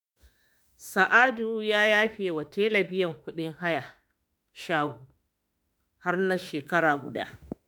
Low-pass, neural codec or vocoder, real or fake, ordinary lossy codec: none; autoencoder, 48 kHz, 32 numbers a frame, DAC-VAE, trained on Japanese speech; fake; none